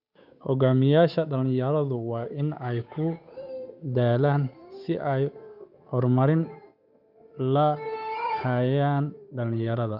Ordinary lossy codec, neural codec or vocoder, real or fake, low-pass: none; codec, 16 kHz, 8 kbps, FunCodec, trained on Chinese and English, 25 frames a second; fake; 5.4 kHz